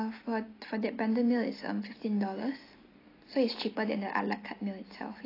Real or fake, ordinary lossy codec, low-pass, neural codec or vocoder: real; AAC, 24 kbps; 5.4 kHz; none